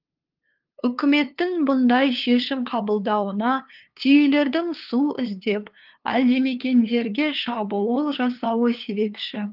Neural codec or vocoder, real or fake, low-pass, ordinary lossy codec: codec, 16 kHz, 2 kbps, FunCodec, trained on LibriTTS, 25 frames a second; fake; 5.4 kHz; Opus, 32 kbps